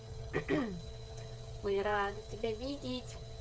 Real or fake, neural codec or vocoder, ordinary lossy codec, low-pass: fake; codec, 16 kHz, 8 kbps, FreqCodec, smaller model; none; none